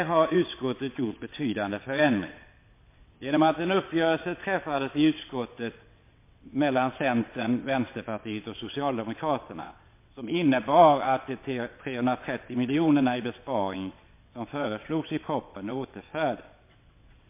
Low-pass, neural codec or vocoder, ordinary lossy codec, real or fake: 3.6 kHz; vocoder, 22.05 kHz, 80 mel bands, WaveNeXt; MP3, 24 kbps; fake